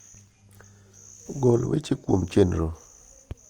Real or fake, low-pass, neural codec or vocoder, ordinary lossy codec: real; 19.8 kHz; none; Opus, 32 kbps